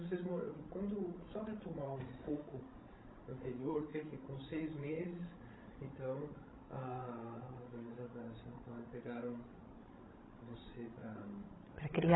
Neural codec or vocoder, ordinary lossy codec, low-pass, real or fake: codec, 16 kHz, 16 kbps, FreqCodec, larger model; AAC, 16 kbps; 7.2 kHz; fake